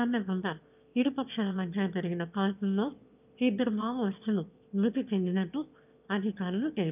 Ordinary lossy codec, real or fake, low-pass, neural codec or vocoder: none; fake; 3.6 kHz; autoencoder, 22.05 kHz, a latent of 192 numbers a frame, VITS, trained on one speaker